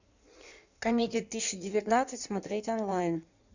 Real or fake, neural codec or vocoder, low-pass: fake; codec, 16 kHz in and 24 kHz out, 1.1 kbps, FireRedTTS-2 codec; 7.2 kHz